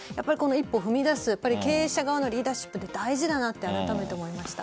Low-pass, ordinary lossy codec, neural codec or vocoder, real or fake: none; none; none; real